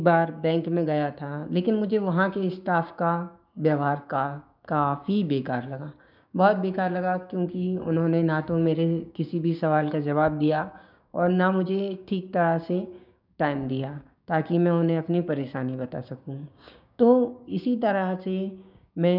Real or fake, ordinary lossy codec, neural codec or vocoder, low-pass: fake; none; codec, 16 kHz, 6 kbps, DAC; 5.4 kHz